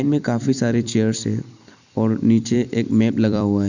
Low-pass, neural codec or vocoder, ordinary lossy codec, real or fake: 7.2 kHz; vocoder, 44.1 kHz, 128 mel bands every 256 samples, BigVGAN v2; none; fake